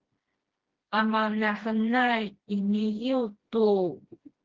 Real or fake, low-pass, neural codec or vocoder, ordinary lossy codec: fake; 7.2 kHz; codec, 16 kHz, 1 kbps, FreqCodec, smaller model; Opus, 16 kbps